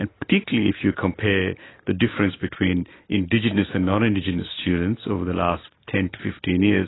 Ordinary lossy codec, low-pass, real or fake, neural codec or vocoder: AAC, 16 kbps; 7.2 kHz; real; none